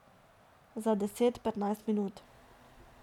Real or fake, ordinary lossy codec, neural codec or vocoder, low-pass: real; none; none; 19.8 kHz